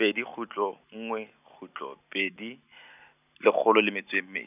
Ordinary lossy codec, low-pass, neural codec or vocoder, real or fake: none; 3.6 kHz; none; real